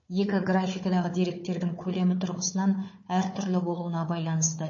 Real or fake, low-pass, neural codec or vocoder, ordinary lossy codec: fake; 7.2 kHz; codec, 16 kHz, 4 kbps, FunCodec, trained on Chinese and English, 50 frames a second; MP3, 32 kbps